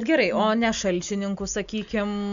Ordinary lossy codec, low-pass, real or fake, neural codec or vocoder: MP3, 96 kbps; 7.2 kHz; real; none